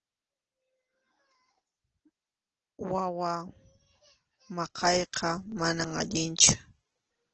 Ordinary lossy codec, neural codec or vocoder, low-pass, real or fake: Opus, 16 kbps; none; 7.2 kHz; real